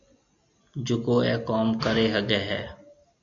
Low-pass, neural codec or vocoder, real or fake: 7.2 kHz; none; real